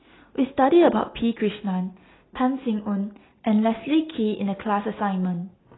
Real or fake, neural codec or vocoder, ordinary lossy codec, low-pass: real; none; AAC, 16 kbps; 7.2 kHz